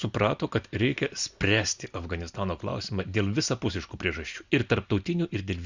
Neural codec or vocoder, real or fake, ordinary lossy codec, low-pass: none; real; Opus, 64 kbps; 7.2 kHz